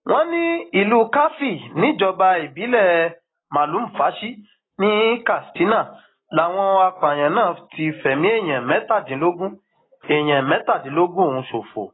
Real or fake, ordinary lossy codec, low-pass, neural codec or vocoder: real; AAC, 16 kbps; 7.2 kHz; none